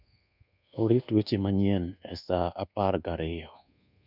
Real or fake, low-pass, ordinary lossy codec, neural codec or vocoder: fake; 5.4 kHz; none; codec, 24 kHz, 1.2 kbps, DualCodec